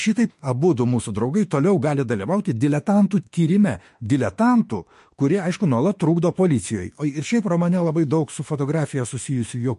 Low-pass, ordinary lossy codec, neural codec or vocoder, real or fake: 14.4 kHz; MP3, 48 kbps; autoencoder, 48 kHz, 32 numbers a frame, DAC-VAE, trained on Japanese speech; fake